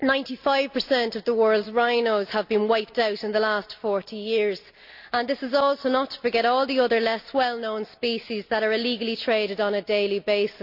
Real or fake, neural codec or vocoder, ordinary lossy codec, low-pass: real; none; none; 5.4 kHz